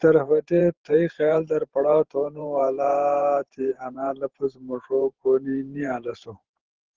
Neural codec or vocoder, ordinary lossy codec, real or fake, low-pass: vocoder, 44.1 kHz, 128 mel bands every 512 samples, BigVGAN v2; Opus, 16 kbps; fake; 7.2 kHz